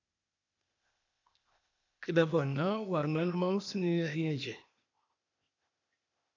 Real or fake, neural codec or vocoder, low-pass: fake; codec, 16 kHz, 0.8 kbps, ZipCodec; 7.2 kHz